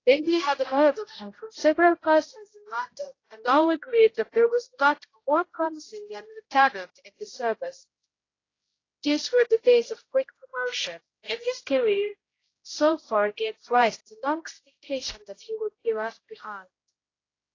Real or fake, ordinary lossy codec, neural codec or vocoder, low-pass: fake; AAC, 32 kbps; codec, 16 kHz, 0.5 kbps, X-Codec, HuBERT features, trained on general audio; 7.2 kHz